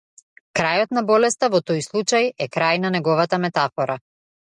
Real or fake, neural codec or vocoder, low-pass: real; none; 10.8 kHz